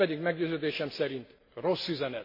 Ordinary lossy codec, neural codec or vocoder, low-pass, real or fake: MP3, 24 kbps; none; 5.4 kHz; real